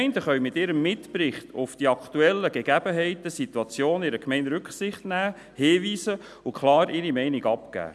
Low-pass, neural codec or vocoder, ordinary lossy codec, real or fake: none; none; none; real